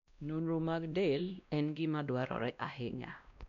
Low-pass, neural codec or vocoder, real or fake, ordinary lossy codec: 7.2 kHz; codec, 16 kHz, 1 kbps, X-Codec, WavLM features, trained on Multilingual LibriSpeech; fake; none